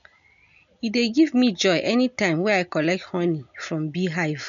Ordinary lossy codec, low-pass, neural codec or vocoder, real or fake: MP3, 96 kbps; 7.2 kHz; none; real